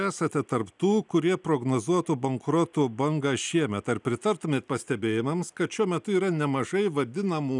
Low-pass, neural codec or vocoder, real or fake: 10.8 kHz; none; real